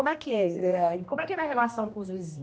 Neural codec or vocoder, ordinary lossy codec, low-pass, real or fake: codec, 16 kHz, 1 kbps, X-Codec, HuBERT features, trained on general audio; none; none; fake